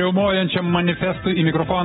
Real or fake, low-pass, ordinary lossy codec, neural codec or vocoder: real; 19.8 kHz; AAC, 16 kbps; none